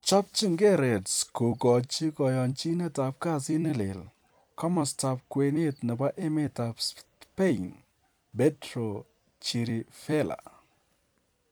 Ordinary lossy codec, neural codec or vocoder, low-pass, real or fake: none; vocoder, 44.1 kHz, 128 mel bands every 256 samples, BigVGAN v2; none; fake